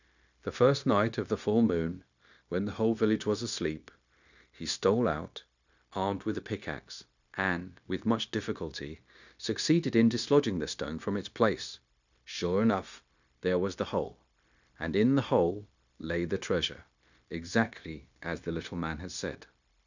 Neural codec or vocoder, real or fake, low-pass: codec, 16 kHz, 0.9 kbps, LongCat-Audio-Codec; fake; 7.2 kHz